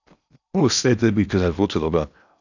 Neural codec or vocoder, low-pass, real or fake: codec, 16 kHz in and 24 kHz out, 0.8 kbps, FocalCodec, streaming, 65536 codes; 7.2 kHz; fake